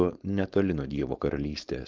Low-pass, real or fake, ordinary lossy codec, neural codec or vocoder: 7.2 kHz; fake; Opus, 24 kbps; codec, 16 kHz, 4.8 kbps, FACodec